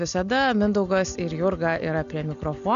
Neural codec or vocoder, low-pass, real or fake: none; 7.2 kHz; real